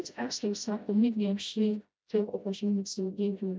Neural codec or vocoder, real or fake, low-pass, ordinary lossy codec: codec, 16 kHz, 0.5 kbps, FreqCodec, smaller model; fake; none; none